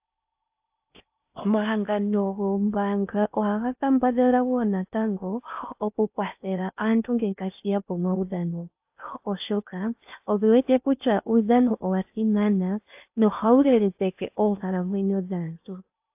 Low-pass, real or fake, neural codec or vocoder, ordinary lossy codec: 3.6 kHz; fake; codec, 16 kHz in and 24 kHz out, 0.6 kbps, FocalCodec, streaming, 4096 codes; AAC, 32 kbps